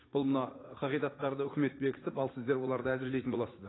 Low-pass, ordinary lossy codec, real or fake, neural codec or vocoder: 7.2 kHz; AAC, 16 kbps; fake; vocoder, 44.1 kHz, 80 mel bands, Vocos